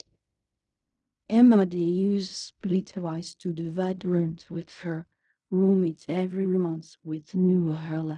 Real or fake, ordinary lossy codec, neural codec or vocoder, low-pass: fake; none; codec, 16 kHz in and 24 kHz out, 0.4 kbps, LongCat-Audio-Codec, fine tuned four codebook decoder; 10.8 kHz